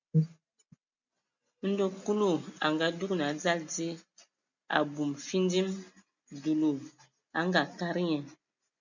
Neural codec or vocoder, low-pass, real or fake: none; 7.2 kHz; real